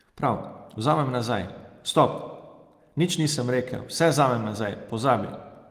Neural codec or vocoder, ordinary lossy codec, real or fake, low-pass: none; Opus, 24 kbps; real; 14.4 kHz